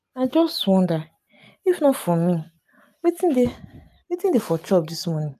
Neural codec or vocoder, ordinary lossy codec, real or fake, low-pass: none; MP3, 96 kbps; real; 14.4 kHz